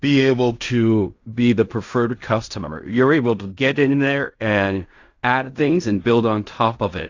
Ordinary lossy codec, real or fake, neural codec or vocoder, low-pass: AAC, 48 kbps; fake; codec, 16 kHz in and 24 kHz out, 0.4 kbps, LongCat-Audio-Codec, fine tuned four codebook decoder; 7.2 kHz